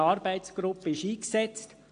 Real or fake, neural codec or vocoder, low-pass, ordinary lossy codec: real; none; 9.9 kHz; AAC, 48 kbps